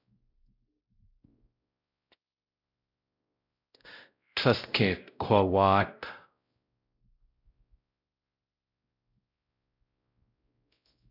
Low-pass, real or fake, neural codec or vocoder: 5.4 kHz; fake; codec, 16 kHz, 0.5 kbps, X-Codec, WavLM features, trained on Multilingual LibriSpeech